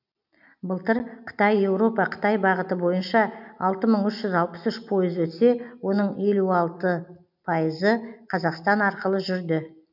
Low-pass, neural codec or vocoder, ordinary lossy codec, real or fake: 5.4 kHz; none; none; real